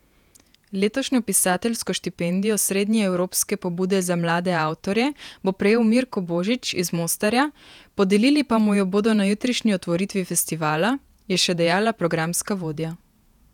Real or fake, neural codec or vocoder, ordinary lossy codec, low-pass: fake; vocoder, 48 kHz, 128 mel bands, Vocos; none; 19.8 kHz